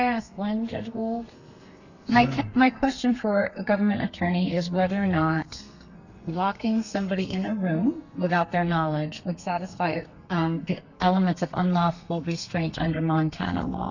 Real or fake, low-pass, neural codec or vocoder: fake; 7.2 kHz; codec, 32 kHz, 1.9 kbps, SNAC